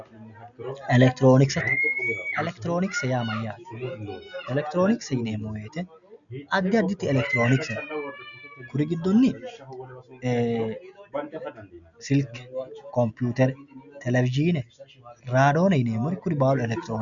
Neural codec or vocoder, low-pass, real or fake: none; 7.2 kHz; real